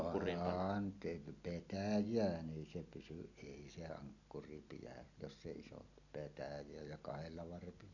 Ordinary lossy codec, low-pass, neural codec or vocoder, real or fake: none; 7.2 kHz; none; real